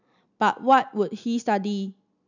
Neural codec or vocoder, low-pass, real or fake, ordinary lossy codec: none; 7.2 kHz; real; none